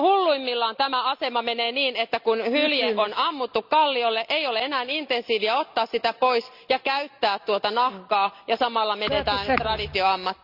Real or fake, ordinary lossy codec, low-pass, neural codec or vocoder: real; none; 5.4 kHz; none